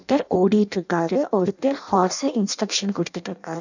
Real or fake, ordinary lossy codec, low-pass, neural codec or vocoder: fake; none; 7.2 kHz; codec, 16 kHz in and 24 kHz out, 0.6 kbps, FireRedTTS-2 codec